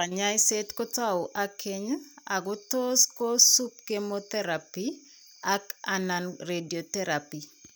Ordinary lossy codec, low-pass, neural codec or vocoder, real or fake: none; none; none; real